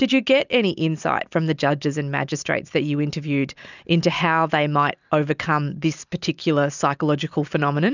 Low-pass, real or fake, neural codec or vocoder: 7.2 kHz; real; none